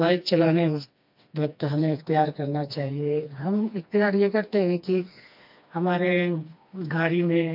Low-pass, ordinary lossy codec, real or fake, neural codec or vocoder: 5.4 kHz; MP3, 48 kbps; fake; codec, 16 kHz, 2 kbps, FreqCodec, smaller model